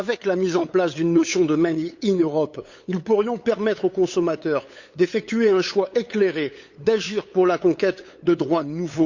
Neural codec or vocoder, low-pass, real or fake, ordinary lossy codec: codec, 16 kHz, 8 kbps, FunCodec, trained on LibriTTS, 25 frames a second; 7.2 kHz; fake; Opus, 64 kbps